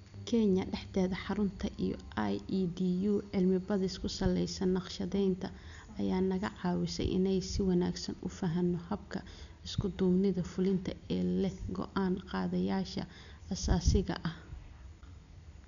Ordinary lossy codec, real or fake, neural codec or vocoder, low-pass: none; real; none; 7.2 kHz